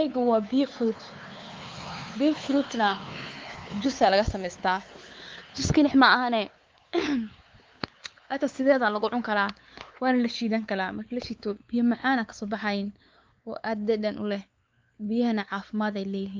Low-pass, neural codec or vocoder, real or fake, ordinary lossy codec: 7.2 kHz; codec, 16 kHz, 4 kbps, X-Codec, WavLM features, trained on Multilingual LibriSpeech; fake; Opus, 24 kbps